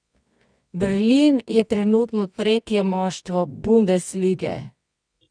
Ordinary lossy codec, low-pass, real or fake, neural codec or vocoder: none; 9.9 kHz; fake; codec, 24 kHz, 0.9 kbps, WavTokenizer, medium music audio release